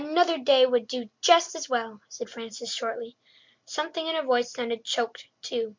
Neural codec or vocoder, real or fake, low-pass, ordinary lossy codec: none; real; 7.2 kHz; MP3, 64 kbps